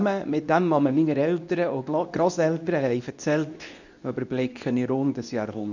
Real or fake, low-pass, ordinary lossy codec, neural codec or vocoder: fake; 7.2 kHz; none; codec, 24 kHz, 0.9 kbps, WavTokenizer, medium speech release version 2